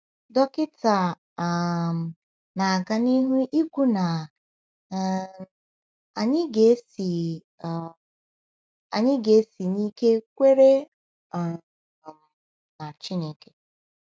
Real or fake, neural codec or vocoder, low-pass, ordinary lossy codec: real; none; none; none